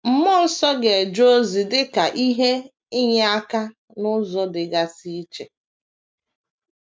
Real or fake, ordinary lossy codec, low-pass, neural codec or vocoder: real; none; none; none